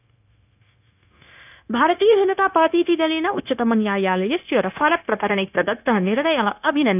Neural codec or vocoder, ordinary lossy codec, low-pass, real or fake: codec, 16 kHz, 0.9 kbps, LongCat-Audio-Codec; none; 3.6 kHz; fake